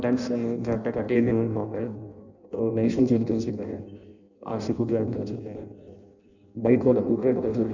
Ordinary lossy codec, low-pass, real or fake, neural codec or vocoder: none; 7.2 kHz; fake; codec, 16 kHz in and 24 kHz out, 0.6 kbps, FireRedTTS-2 codec